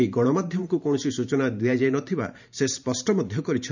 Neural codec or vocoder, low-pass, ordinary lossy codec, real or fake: vocoder, 44.1 kHz, 128 mel bands every 512 samples, BigVGAN v2; 7.2 kHz; none; fake